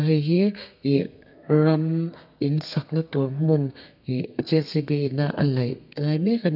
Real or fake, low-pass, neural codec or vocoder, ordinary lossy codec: fake; 5.4 kHz; codec, 32 kHz, 1.9 kbps, SNAC; none